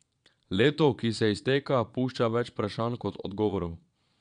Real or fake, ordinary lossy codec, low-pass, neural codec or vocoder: fake; none; 9.9 kHz; vocoder, 22.05 kHz, 80 mel bands, Vocos